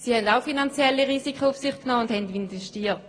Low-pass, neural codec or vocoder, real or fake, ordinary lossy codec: 9.9 kHz; none; real; AAC, 32 kbps